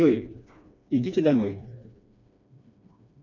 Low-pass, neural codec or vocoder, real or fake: 7.2 kHz; codec, 16 kHz, 2 kbps, FreqCodec, smaller model; fake